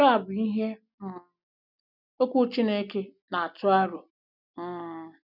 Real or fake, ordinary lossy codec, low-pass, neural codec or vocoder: real; none; 5.4 kHz; none